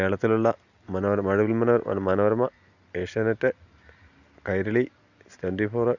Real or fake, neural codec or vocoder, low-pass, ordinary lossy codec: real; none; 7.2 kHz; none